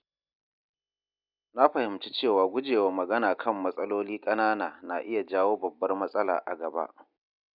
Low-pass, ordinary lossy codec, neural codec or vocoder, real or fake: 5.4 kHz; none; none; real